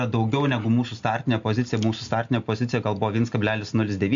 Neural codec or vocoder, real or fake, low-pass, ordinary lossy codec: none; real; 7.2 kHz; MP3, 64 kbps